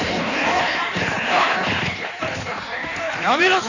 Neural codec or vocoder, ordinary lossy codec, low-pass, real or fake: codec, 16 kHz in and 24 kHz out, 1.1 kbps, FireRedTTS-2 codec; none; 7.2 kHz; fake